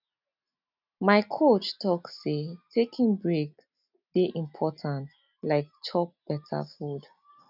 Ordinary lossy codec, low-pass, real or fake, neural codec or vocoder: none; 5.4 kHz; real; none